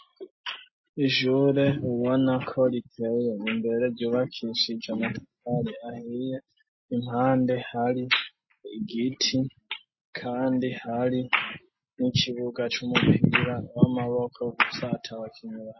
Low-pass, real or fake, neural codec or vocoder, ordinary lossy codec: 7.2 kHz; real; none; MP3, 24 kbps